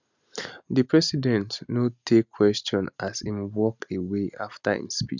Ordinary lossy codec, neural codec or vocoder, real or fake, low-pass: none; none; real; 7.2 kHz